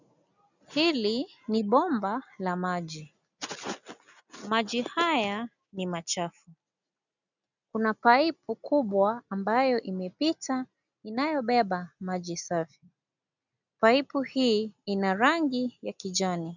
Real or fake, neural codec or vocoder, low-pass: real; none; 7.2 kHz